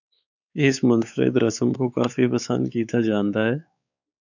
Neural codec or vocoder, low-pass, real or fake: codec, 16 kHz, 4 kbps, X-Codec, WavLM features, trained on Multilingual LibriSpeech; 7.2 kHz; fake